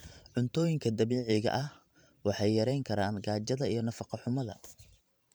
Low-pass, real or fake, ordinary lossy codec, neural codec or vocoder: none; fake; none; vocoder, 44.1 kHz, 128 mel bands every 512 samples, BigVGAN v2